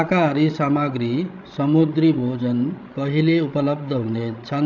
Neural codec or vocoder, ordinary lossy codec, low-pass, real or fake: codec, 16 kHz, 16 kbps, FreqCodec, larger model; none; 7.2 kHz; fake